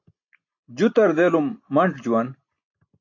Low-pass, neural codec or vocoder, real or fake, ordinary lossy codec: 7.2 kHz; none; real; AAC, 48 kbps